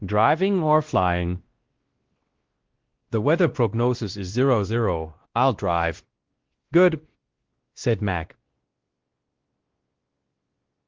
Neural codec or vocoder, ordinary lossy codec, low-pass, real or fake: codec, 16 kHz, 1 kbps, X-Codec, WavLM features, trained on Multilingual LibriSpeech; Opus, 16 kbps; 7.2 kHz; fake